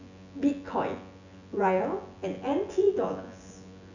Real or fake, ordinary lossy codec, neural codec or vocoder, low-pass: fake; none; vocoder, 24 kHz, 100 mel bands, Vocos; 7.2 kHz